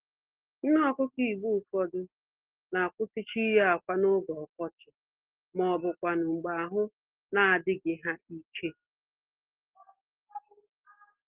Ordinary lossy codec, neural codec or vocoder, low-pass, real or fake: Opus, 16 kbps; none; 3.6 kHz; real